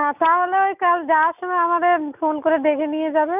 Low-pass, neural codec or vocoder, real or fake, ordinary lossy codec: 3.6 kHz; none; real; none